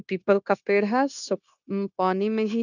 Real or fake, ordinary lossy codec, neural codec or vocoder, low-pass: fake; none; codec, 16 kHz, 0.9 kbps, LongCat-Audio-Codec; 7.2 kHz